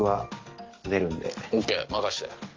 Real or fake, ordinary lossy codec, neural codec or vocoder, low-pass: real; Opus, 32 kbps; none; 7.2 kHz